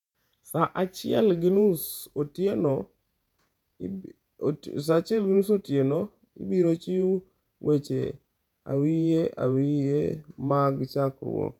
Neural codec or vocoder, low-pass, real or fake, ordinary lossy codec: vocoder, 48 kHz, 128 mel bands, Vocos; 19.8 kHz; fake; none